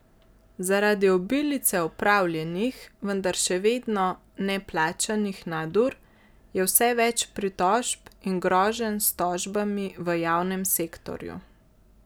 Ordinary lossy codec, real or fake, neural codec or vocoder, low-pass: none; real; none; none